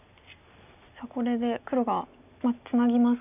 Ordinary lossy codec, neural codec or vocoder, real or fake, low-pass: none; none; real; 3.6 kHz